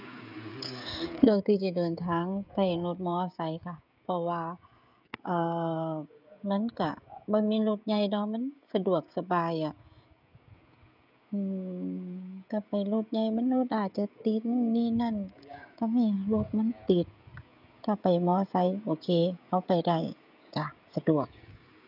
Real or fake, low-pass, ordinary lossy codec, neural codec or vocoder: fake; 5.4 kHz; none; codec, 16 kHz, 16 kbps, FreqCodec, smaller model